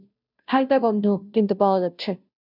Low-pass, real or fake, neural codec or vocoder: 5.4 kHz; fake; codec, 16 kHz, 0.5 kbps, FunCodec, trained on Chinese and English, 25 frames a second